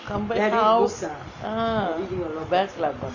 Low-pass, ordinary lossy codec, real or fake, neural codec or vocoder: 7.2 kHz; none; real; none